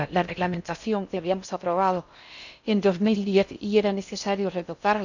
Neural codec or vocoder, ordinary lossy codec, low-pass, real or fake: codec, 16 kHz in and 24 kHz out, 0.6 kbps, FocalCodec, streaming, 4096 codes; none; 7.2 kHz; fake